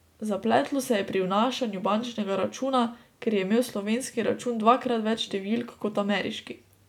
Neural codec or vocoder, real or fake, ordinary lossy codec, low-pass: none; real; none; 19.8 kHz